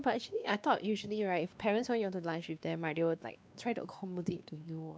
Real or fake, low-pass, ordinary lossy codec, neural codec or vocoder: fake; none; none; codec, 16 kHz, 2 kbps, X-Codec, WavLM features, trained on Multilingual LibriSpeech